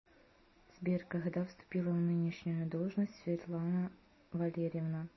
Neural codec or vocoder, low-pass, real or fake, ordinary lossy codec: none; 7.2 kHz; real; MP3, 24 kbps